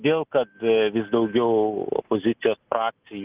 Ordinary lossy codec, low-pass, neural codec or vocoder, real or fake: Opus, 32 kbps; 3.6 kHz; none; real